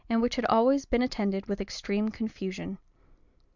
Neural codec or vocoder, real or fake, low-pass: none; real; 7.2 kHz